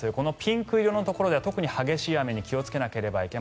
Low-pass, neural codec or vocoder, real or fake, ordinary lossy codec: none; none; real; none